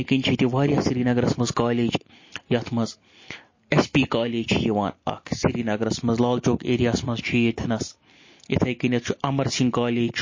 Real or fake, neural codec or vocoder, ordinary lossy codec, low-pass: real; none; MP3, 32 kbps; 7.2 kHz